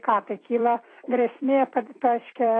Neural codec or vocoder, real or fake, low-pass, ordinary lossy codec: vocoder, 44.1 kHz, 128 mel bands every 256 samples, BigVGAN v2; fake; 9.9 kHz; AAC, 32 kbps